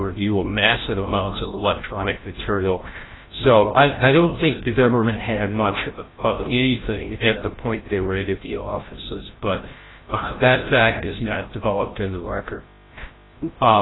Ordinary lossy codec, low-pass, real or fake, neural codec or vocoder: AAC, 16 kbps; 7.2 kHz; fake; codec, 16 kHz, 0.5 kbps, FreqCodec, larger model